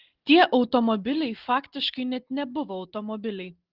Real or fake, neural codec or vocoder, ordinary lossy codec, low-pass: real; none; Opus, 16 kbps; 5.4 kHz